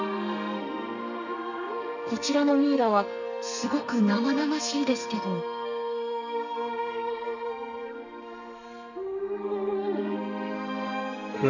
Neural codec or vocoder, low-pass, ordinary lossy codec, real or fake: codec, 32 kHz, 1.9 kbps, SNAC; 7.2 kHz; none; fake